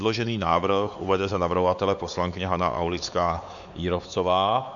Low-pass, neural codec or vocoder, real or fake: 7.2 kHz; codec, 16 kHz, 4 kbps, X-Codec, WavLM features, trained on Multilingual LibriSpeech; fake